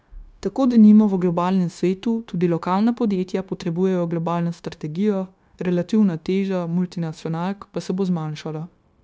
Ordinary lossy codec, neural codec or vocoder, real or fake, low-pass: none; codec, 16 kHz, 0.9 kbps, LongCat-Audio-Codec; fake; none